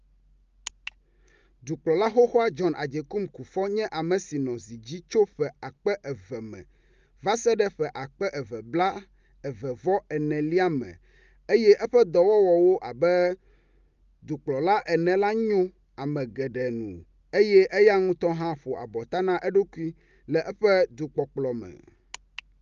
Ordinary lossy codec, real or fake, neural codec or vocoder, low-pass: Opus, 32 kbps; real; none; 7.2 kHz